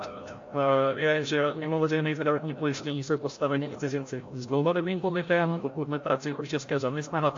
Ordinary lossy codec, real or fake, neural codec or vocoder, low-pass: AAC, 48 kbps; fake; codec, 16 kHz, 0.5 kbps, FreqCodec, larger model; 7.2 kHz